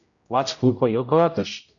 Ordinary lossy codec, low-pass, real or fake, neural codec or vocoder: MP3, 96 kbps; 7.2 kHz; fake; codec, 16 kHz, 0.5 kbps, X-Codec, HuBERT features, trained on general audio